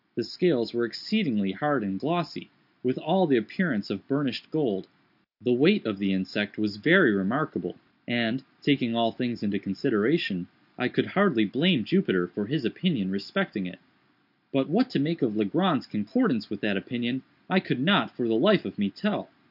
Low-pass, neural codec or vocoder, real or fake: 5.4 kHz; none; real